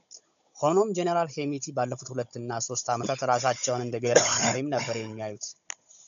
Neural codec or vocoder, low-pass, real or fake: codec, 16 kHz, 16 kbps, FunCodec, trained on Chinese and English, 50 frames a second; 7.2 kHz; fake